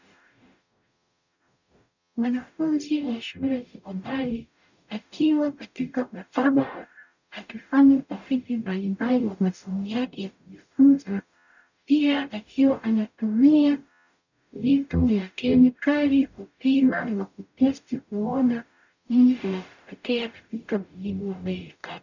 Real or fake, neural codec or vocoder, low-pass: fake; codec, 44.1 kHz, 0.9 kbps, DAC; 7.2 kHz